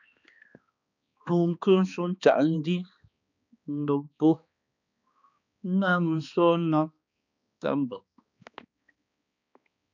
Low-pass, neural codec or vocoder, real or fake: 7.2 kHz; codec, 16 kHz, 2 kbps, X-Codec, HuBERT features, trained on balanced general audio; fake